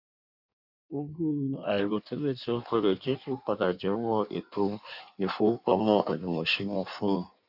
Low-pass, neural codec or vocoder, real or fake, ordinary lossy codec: 5.4 kHz; codec, 16 kHz in and 24 kHz out, 1.1 kbps, FireRedTTS-2 codec; fake; none